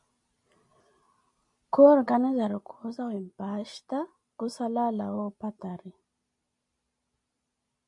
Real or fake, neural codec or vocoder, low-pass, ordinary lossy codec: real; none; 10.8 kHz; MP3, 64 kbps